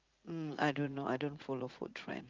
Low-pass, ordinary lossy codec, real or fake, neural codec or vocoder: 7.2 kHz; Opus, 32 kbps; fake; vocoder, 44.1 kHz, 80 mel bands, Vocos